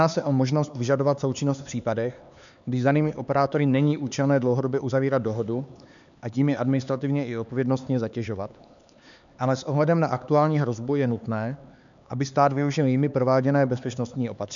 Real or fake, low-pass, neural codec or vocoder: fake; 7.2 kHz; codec, 16 kHz, 4 kbps, X-Codec, HuBERT features, trained on LibriSpeech